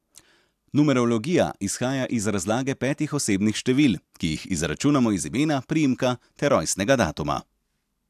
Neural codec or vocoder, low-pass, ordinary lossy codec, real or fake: none; 14.4 kHz; AAC, 96 kbps; real